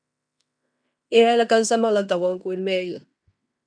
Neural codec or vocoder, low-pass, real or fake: codec, 16 kHz in and 24 kHz out, 0.9 kbps, LongCat-Audio-Codec, fine tuned four codebook decoder; 9.9 kHz; fake